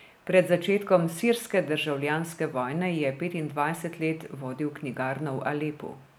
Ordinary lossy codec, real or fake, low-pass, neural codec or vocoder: none; real; none; none